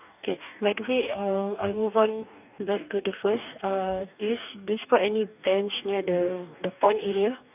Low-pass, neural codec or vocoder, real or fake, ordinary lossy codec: 3.6 kHz; codec, 44.1 kHz, 2.6 kbps, DAC; fake; none